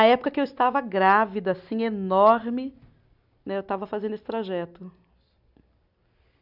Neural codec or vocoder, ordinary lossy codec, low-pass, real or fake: none; none; 5.4 kHz; real